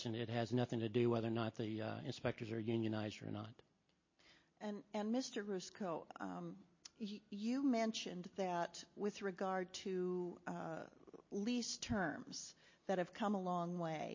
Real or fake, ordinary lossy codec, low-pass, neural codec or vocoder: real; MP3, 32 kbps; 7.2 kHz; none